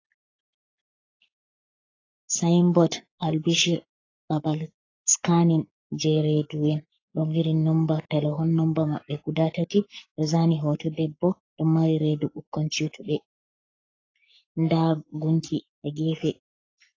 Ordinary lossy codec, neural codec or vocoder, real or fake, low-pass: AAC, 32 kbps; codec, 44.1 kHz, 7.8 kbps, Pupu-Codec; fake; 7.2 kHz